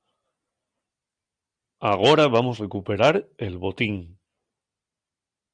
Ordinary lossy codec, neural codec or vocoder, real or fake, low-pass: Opus, 64 kbps; none; real; 9.9 kHz